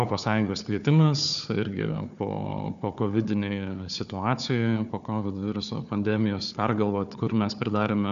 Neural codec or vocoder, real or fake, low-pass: codec, 16 kHz, 4 kbps, FunCodec, trained on Chinese and English, 50 frames a second; fake; 7.2 kHz